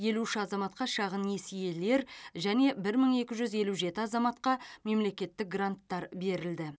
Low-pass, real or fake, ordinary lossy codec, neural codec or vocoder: none; real; none; none